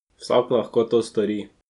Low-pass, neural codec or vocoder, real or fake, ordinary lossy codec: 10.8 kHz; none; real; none